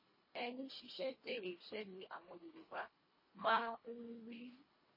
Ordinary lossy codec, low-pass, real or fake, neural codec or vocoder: MP3, 24 kbps; 5.4 kHz; fake; codec, 24 kHz, 1.5 kbps, HILCodec